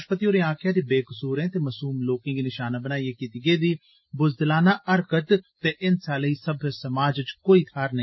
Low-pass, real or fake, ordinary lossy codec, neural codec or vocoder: 7.2 kHz; real; MP3, 24 kbps; none